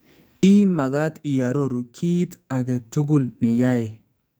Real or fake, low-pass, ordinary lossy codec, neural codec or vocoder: fake; none; none; codec, 44.1 kHz, 2.6 kbps, SNAC